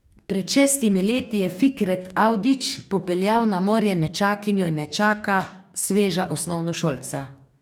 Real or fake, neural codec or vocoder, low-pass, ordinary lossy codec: fake; codec, 44.1 kHz, 2.6 kbps, DAC; 19.8 kHz; none